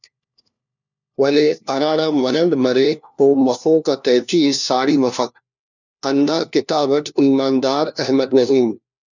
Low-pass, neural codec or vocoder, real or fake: 7.2 kHz; codec, 16 kHz, 1 kbps, FunCodec, trained on LibriTTS, 50 frames a second; fake